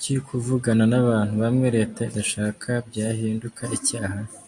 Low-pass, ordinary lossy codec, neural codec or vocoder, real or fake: 10.8 kHz; AAC, 64 kbps; none; real